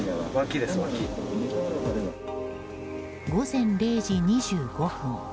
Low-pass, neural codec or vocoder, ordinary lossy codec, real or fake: none; none; none; real